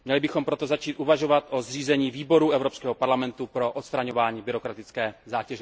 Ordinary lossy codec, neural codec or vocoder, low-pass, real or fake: none; none; none; real